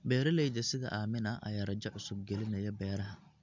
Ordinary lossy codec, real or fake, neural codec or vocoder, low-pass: MP3, 64 kbps; real; none; 7.2 kHz